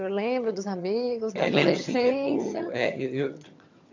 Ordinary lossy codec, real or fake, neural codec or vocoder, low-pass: MP3, 64 kbps; fake; vocoder, 22.05 kHz, 80 mel bands, HiFi-GAN; 7.2 kHz